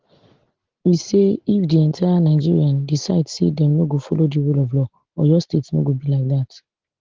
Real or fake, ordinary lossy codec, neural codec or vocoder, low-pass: real; Opus, 16 kbps; none; 7.2 kHz